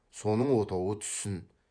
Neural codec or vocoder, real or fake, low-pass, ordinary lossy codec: vocoder, 24 kHz, 100 mel bands, Vocos; fake; 9.9 kHz; none